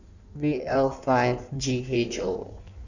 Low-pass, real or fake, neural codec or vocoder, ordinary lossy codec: 7.2 kHz; fake; codec, 16 kHz in and 24 kHz out, 1.1 kbps, FireRedTTS-2 codec; none